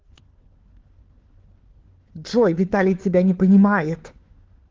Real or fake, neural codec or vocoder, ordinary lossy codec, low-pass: fake; codec, 16 kHz, 4 kbps, FunCodec, trained on LibriTTS, 50 frames a second; Opus, 16 kbps; 7.2 kHz